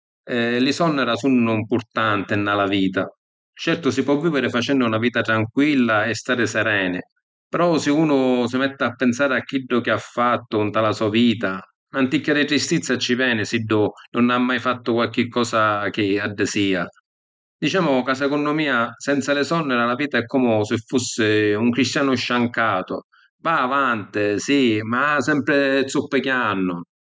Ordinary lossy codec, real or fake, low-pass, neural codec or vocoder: none; real; none; none